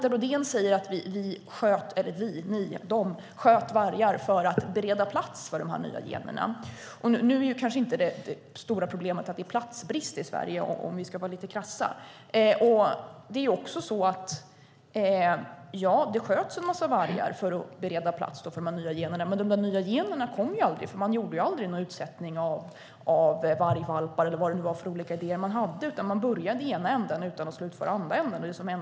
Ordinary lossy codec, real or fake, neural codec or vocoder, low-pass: none; real; none; none